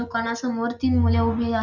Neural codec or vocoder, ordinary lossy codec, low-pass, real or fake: none; none; 7.2 kHz; real